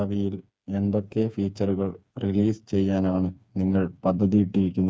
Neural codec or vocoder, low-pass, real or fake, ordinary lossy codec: codec, 16 kHz, 4 kbps, FreqCodec, smaller model; none; fake; none